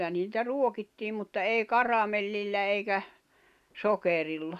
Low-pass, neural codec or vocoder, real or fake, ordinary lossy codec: 14.4 kHz; none; real; none